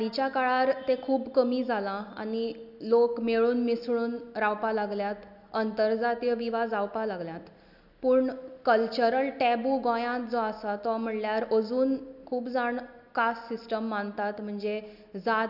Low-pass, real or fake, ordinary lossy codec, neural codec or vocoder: 5.4 kHz; real; none; none